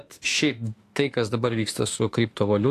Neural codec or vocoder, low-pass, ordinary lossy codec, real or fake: autoencoder, 48 kHz, 32 numbers a frame, DAC-VAE, trained on Japanese speech; 14.4 kHz; AAC, 48 kbps; fake